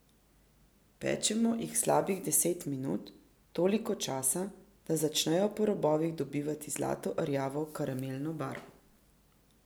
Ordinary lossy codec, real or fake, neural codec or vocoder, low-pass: none; real; none; none